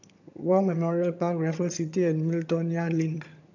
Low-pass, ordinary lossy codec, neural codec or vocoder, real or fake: 7.2 kHz; none; vocoder, 22.05 kHz, 80 mel bands, HiFi-GAN; fake